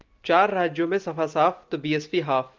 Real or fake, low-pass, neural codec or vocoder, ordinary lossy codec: real; 7.2 kHz; none; Opus, 32 kbps